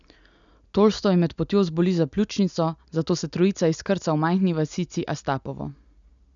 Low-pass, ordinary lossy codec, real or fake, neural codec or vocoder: 7.2 kHz; none; real; none